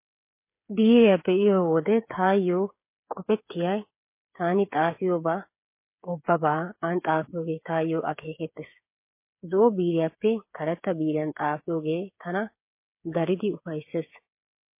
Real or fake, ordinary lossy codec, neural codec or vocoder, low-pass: fake; MP3, 24 kbps; codec, 16 kHz, 8 kbps, FreqCodec, smaller model; 3.6 kHz